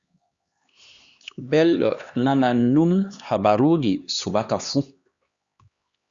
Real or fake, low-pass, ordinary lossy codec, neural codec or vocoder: fake; 7.2 kHz; Opus, 64 kbps; codec, 16 kHz, 2 kbps, X-Codec, HuBERT features, trained on LibriSpeech